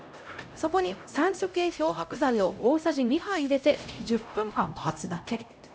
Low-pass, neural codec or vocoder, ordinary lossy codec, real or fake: none; codec, 16 kHz, 0.5 kbps, X-Codec, HuBERT features, trained on LibriSpeech; none; fake